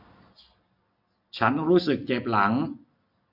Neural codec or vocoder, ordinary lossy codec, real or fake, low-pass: none; AAC, 48 kbps; real; 5.4 kHz